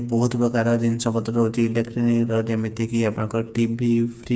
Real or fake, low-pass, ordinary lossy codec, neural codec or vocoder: fake; none; none; codec, 16 kHz, 4 kbps, FreqCodec, smaller model